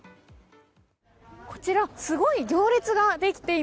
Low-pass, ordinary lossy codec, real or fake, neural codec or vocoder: none; none; real; none